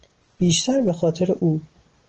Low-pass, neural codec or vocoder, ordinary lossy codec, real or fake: 7.2 kHz; none; Opus, 16 kbps; real